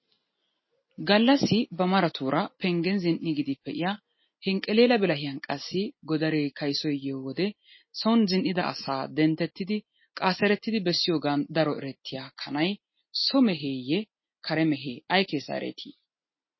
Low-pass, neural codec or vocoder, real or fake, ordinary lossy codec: 7.2 kHz; none; real; MP3, 24 kbps